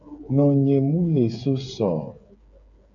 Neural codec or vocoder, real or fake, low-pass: codec, 16 kHz, 8 kbps, FreqCodec, smaller model; fake; 7.2 kHz